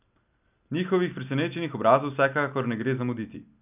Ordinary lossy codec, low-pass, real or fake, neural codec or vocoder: none; 3.6 kHz; real; none